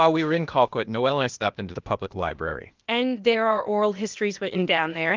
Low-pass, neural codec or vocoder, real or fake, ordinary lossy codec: 7.2 kHz; codec, 16 kHz, 0.8 kbps, ZipCodec; fake; Opus, 32 kbps